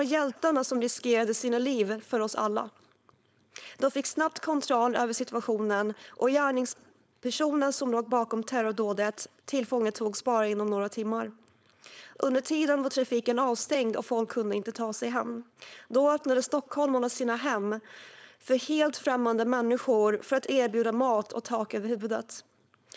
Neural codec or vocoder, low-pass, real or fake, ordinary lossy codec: codec, 16 kHz, 4.8 kbps, FACodec; none; fake; none